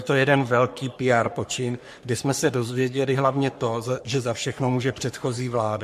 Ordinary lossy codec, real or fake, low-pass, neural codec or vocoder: MP3, 64 kbps; fake; 14.4 kHz; codec, 44.1 kHz, 3.4 kbps, Pupu-Codec